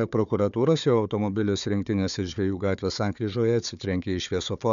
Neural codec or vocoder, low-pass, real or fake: codec, 16 kHz, 4 kbps, FunCodec, trained on Chinese and English, 50 frames a second; 7.2 kHz; fake